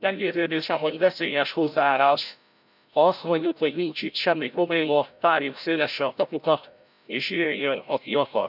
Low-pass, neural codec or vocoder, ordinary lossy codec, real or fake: 5.4 kHz; codec, 16 kHz, 0.5 kbps, FreqCodec, larger model; none; fake